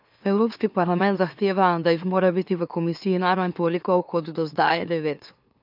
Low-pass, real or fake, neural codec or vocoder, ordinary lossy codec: 5.4 kHz; fake; autoencoder, 44.1 kHz, a latent of 192 numbers a frame, MeloTTS; none